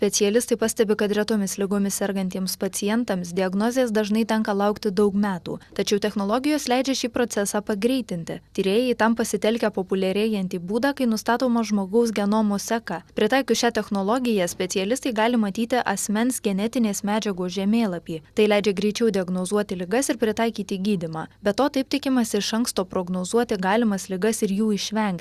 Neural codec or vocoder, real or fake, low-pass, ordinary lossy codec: none; real; 14.4 kHz; Opus, 64 kbps